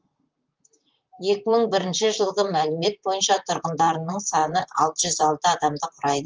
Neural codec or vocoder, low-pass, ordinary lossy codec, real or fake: vocoder, 44.1 kHz, 128 mel bands, Pupu-Vocoder; 7.2 kHz; Opus, 24 kbps; fake